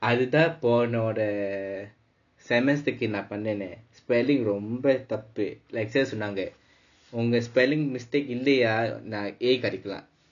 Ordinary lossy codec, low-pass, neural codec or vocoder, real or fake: none; 7.2 kHz; none; real